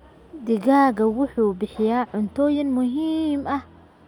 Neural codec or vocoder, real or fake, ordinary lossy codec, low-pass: none; real; none; 19.8 kHz